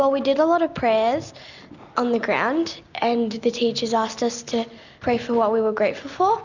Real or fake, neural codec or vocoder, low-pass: real; none; 7.2 kHz